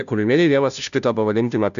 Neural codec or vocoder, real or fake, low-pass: codec, 16 kHz, 0.5 kbps, FunCodec, trained on Chinese and English, 25 frames a second; fake; 7.2 kHz